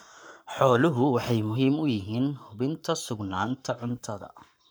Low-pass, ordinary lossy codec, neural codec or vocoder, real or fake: none; none; codec, 44.1 kHz, 7.8 kbps, Pupu-Codec; fake